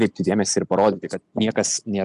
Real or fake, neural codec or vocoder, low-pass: real; none; 10.8 kHz